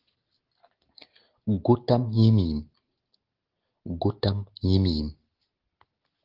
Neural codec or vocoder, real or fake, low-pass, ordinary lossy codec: none; real; 5.4 kHz; Opus, 16 kbps